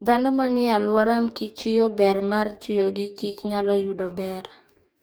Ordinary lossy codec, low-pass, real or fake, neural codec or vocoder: none; none; fake; codec, 44.1 kHz, 2.6 kbps, DAC